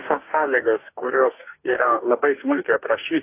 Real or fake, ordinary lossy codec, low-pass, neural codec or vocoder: fake; AAC, 24 kbps; 3.6 kHz; codec, 44.1 kHz, 2.6 kbps, DAC